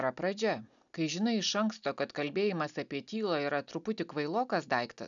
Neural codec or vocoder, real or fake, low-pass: none; real; 7.2 kHz